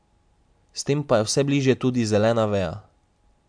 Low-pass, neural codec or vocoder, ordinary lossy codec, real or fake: 9.9 kHz; none; MP3, 64 kbps; real